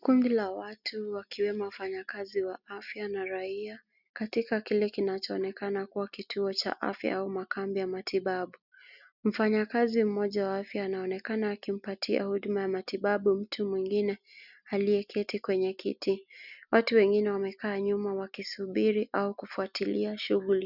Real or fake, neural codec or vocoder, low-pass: real; none; 5.4 kHz